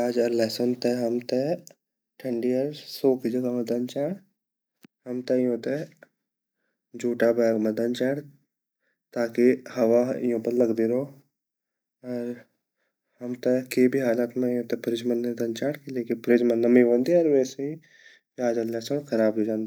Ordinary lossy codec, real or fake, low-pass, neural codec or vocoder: none; real; none; none